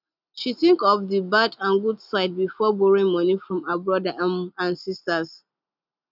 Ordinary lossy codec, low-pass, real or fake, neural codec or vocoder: none; 5.4 kHz; real; none